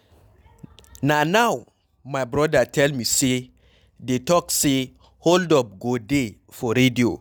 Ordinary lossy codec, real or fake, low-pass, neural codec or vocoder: none; real; none; none